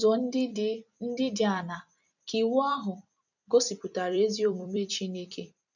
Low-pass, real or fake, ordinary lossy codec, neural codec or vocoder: 7.2 kHz; fake; none; vocoder, 44.1 kHz, 128 mel bands every 256 samples, BigVGAN v2